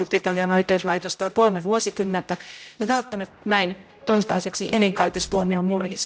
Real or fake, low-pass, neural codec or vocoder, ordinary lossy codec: fake; none; codec, 16 kHz, 0.5 kbps, X-Codec, HuBERT features, trained on general audio; none